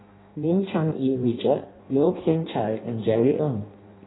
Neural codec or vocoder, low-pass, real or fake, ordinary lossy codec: codec, 16 kHz in and 24 kHz out, 0.6 kbps, FireRedTTS-2 codec; 7.2 kHz; fake; AAC, 16 kbps